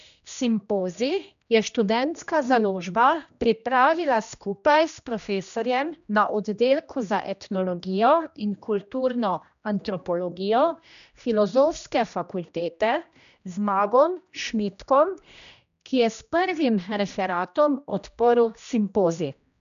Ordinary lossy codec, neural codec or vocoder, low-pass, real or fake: none; codec, 16 kHz, 1 kbps, X-Codec, HuBERT features, trained on general audio; 7.2 kHz; fake